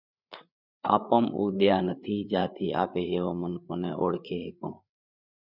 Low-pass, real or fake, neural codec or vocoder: 5.4 kHz; fake; codec, 16 kHz, 8 kbps, FreqCodec, larger model